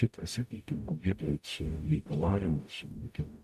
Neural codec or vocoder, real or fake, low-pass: codec, 44.1 kHz, 0.9 kbps, DAC; fake; 14.4 kHz